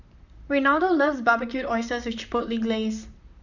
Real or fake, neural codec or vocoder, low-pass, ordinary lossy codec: fake; vocoder, 44.1 kHz, 128 mel bands, Pupu-Vocoder; 7.2 kHz; none